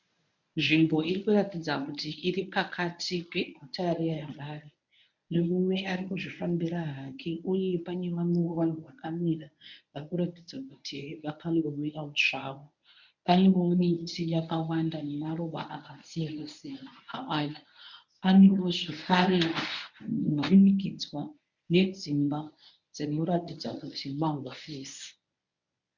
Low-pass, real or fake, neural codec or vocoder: 7.2 kHz; fake; codec, 24 kHz, 0.9 kbps, WavTokenizer, medium speech release version 1